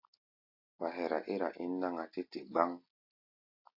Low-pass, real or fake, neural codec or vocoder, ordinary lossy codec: 5.4 kHz; real; none; MP3, 32 kbps